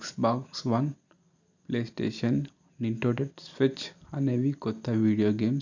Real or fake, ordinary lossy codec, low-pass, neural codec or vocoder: real; none; 7.2 kHz; none